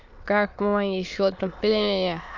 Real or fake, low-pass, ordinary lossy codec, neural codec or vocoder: fake; 7.2 kHz; none; autoencoder, 22.05 kHz, a latent of 192 numbers a frame, VITS, trained on many speakers